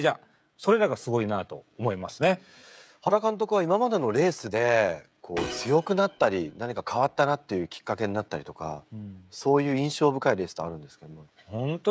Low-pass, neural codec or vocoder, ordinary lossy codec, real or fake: none; codec, 16 kHz, 16 kbps, FreqCodec, smaller model; none; fake